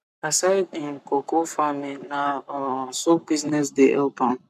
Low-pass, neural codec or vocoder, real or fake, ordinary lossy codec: 14.4 kHz; codec, 44.1 kHz, 7.8 kbps, Pupu-Codec; fake; none